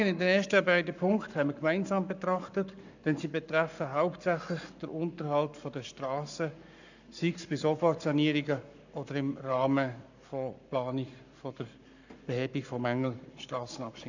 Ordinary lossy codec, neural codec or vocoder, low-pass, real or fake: none; codec, 44.1 kHz, 7.8 kbps, Pupu-Codec; 7.2 kHz; fake